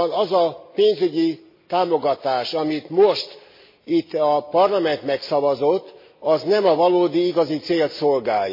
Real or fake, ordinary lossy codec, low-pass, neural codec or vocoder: real; MP3, 24 kbps; 5.4 kHz; none